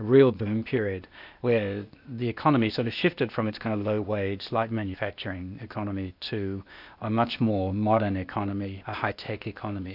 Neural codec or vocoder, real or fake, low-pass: codec, 16 kHz, 0.8 kbps, ZipCodec; fake; 5.4 kHz